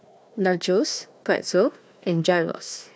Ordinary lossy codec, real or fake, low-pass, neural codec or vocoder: none; fake; none; codec, 16 kHz, 1 kbps, FunCodec, trained on Chinese and English, 50 frames a second